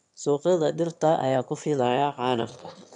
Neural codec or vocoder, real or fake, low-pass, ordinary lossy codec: autoencoder, 22.05 kHz, a latent of 192 numbers a frame, VITS, trained on one speaker; fake; 9.9 kHz; none